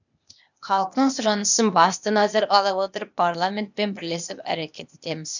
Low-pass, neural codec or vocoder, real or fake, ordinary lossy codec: 7.2 kHz; codec, 16 kHz, 0.8 kbps, ZipCodec; fake; none